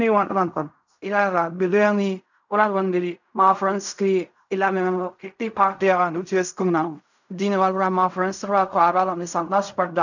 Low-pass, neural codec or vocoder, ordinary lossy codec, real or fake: 7.2 kHz; codec, 16 kHz in and 24 kHz out, 0.4 kbps, LongCat-Audio-Codec, fine tuned four codebook decoder; none; fake